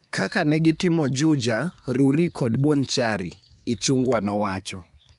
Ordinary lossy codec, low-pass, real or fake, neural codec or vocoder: none; 10.8 kHz; fake; codec, 24 kHz, 1 kbps, SNAC